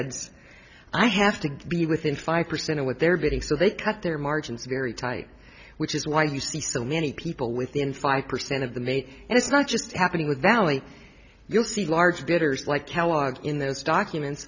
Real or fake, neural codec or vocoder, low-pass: real; none; 7.2 kHz